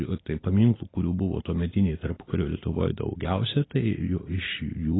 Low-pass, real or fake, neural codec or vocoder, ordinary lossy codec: 7.2 kHz; real; none; AAC, 16 kbps